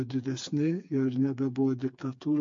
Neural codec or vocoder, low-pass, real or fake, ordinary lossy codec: codec, 16 kHz, 4 kbps, FreqCodec, smaller model; 7.2 kHz; fake; MP3, 48 kbps